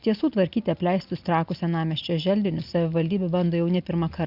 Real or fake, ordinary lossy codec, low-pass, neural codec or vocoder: real; Opus, 64 kbps; 5.4 kHz; none